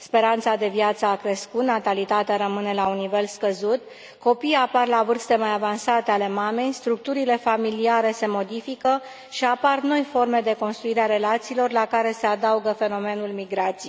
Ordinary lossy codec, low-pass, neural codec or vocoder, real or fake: none; none; none; real